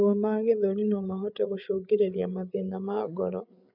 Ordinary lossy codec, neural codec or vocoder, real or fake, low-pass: none; codec, 16 kHz, 8 kbps, FreqCodec, larger model; fake; 5.4 kHz